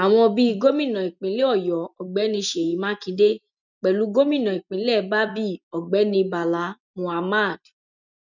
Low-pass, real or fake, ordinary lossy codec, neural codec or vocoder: 7.2 kHz; real; none; none